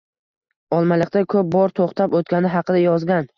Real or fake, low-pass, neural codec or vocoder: real; 7.2 kHz; none